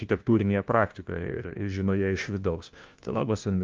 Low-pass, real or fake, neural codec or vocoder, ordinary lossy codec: 7.2 kHz; fake; codec, 16 kHz, 1 kbps, FunCodec, trained on LibriTTS, 50 frames a second; Opus, 24 kbps